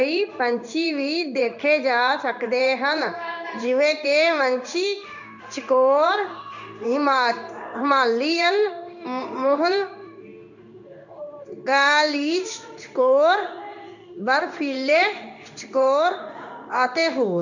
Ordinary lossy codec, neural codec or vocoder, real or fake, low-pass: none; codec, 16 kHz in and 24 kHz out, 1 kbps, XY-Tokenizer; fake; 7.2 kHz